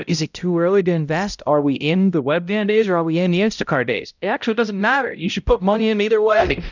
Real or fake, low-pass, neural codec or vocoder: fake; 7.2 kHz; codec, 16 kHz, 0.5 kbps, X-Codec, HuBERT features, trained on balanced general audio